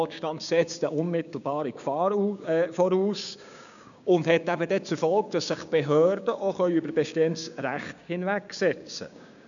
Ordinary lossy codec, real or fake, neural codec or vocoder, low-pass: none; fake; codec, 16 kHz, 6 kbps, DAC; 7.2 kHz